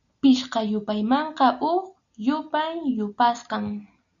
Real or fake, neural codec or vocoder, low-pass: real; none; 7.2 kHz